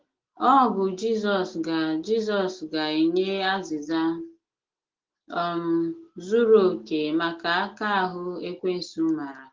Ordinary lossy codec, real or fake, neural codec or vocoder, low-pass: Opus, 16 kbps; real; none; 7.2 kHz